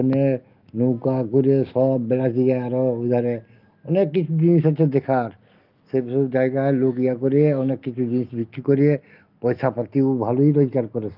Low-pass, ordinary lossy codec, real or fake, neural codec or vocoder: 5.4 kHz; Opus, 32 kbps; real; none